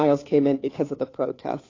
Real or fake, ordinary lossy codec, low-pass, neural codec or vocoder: fake; AAC, 32 kbps; 7.2 kHz; codec, 16 kHz, 2 kbps, FunCodec, trained on Chinese and English, 25 frames a second